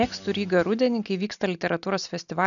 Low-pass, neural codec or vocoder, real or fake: 7.2 kHz; none; real